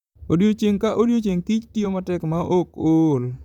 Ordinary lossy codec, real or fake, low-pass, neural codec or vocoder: none; fake; 19.8 kHz; vocoder, 44.1 kHz, 128 mel bands, Pupu-Vocoder